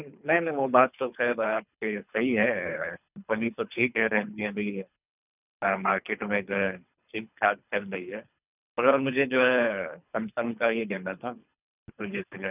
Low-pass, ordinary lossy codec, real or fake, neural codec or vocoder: 3.6 kHz; none; fake; codec, 24 kHz, 3 kbps, HILCodec